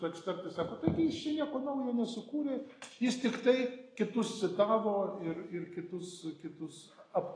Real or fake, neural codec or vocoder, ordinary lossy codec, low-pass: real; none; AAC, 32 kbps; 9.9 kHz